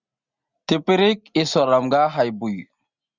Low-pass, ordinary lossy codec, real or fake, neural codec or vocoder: 7.2 kHz; Opus, 64 kbps; real; none